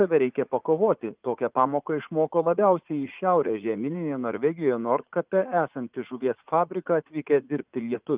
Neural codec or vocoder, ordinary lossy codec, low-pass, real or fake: vocoder, 44.1 kHz, 80 mel bands, Vocos; Opus, 32 kbps; 3.6 kHz; fake